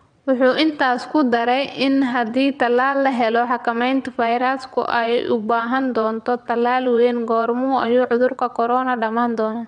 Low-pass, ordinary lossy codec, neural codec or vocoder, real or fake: 9.9 kHz; none; vocoder, 22.05 kHz, 80 mel bands, WaveNeXt; fake